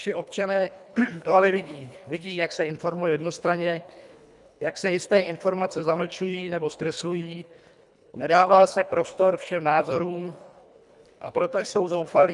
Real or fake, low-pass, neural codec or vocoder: fake; 10.8 kHz; codec, 24 kHz, 1.5 kbps, HILCodec